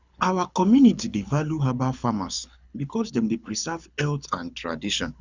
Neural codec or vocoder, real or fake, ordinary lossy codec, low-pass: codec, 24 kHz, 6 kbps, HILCodec; fake; Opus, 64 kbps; 7.2 kHz